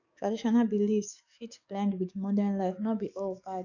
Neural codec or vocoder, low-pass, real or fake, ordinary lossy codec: codec, 44.1 kHz, 7.8 kbps, DAC; 7.2 kHz; fake; none